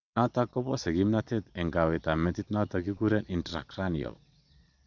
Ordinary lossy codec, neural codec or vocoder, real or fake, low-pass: none; vocoder, 22.05 kHz, 80 mel bands, Vocos; fake; 7.2 kHz